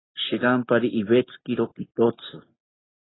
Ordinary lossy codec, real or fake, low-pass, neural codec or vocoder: AAC, 16 kbps; real; 7.2 kHz; none